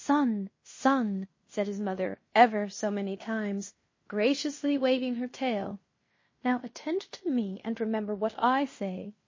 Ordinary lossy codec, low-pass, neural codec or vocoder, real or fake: MP3, 32 kbps; 7.2 kHz; codec, 16 kHz in and 24 kHz out, 0.9 kbps, LongCat-Audio-Codec, fine tuned four codebook decoder; fake